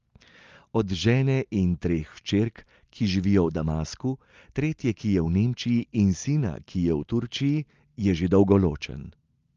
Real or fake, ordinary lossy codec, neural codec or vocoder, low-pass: real; Opus, 32 kbps; none; 7.2 kHz